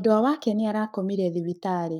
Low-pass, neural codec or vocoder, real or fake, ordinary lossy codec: 19.8 kHz; codec, 44.1 kHz, 7.8 kbps, Pupu-Codec; fake; none